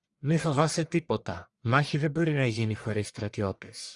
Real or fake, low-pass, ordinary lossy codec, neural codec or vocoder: fake; 10.8 kHz; Opus, 64 kbps; codec, 44.1 kHz, 1.7 kbps, Pupu-Codec